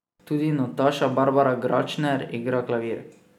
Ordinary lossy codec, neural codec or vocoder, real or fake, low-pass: none; none; real; 19.8 kHz